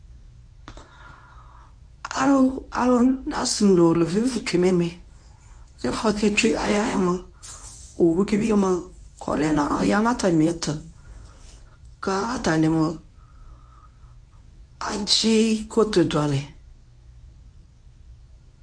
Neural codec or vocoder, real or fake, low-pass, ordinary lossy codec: codec, 24 kHz, 0.9 kbps, WavTokenizer, medium speech release version 1; fake; 9.9 kHz; AAC, 64 kbps